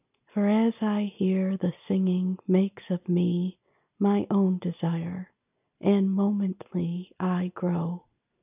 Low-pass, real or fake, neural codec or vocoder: 3.6 kHz; real; none